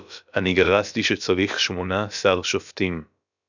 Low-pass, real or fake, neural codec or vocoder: 7.2 kHz; fake; codec, 16 kHz, about 1 kbps, DyCAST, with the encoder's durations